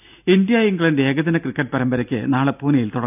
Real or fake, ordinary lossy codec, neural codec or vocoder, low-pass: real; none; none; 3.6 kHz